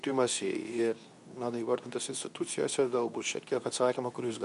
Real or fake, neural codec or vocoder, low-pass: fake; codec, 24 kHz, 0.9 kbps, WavTokenizer, medium speech release version 2; 10.8 kHz